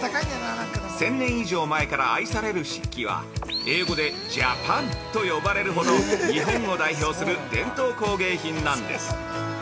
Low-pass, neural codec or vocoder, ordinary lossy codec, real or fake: none; none; none; real